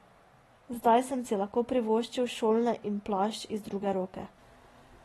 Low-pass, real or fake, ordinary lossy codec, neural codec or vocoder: 19.8 kHz; real; AAC, 32 kbps; none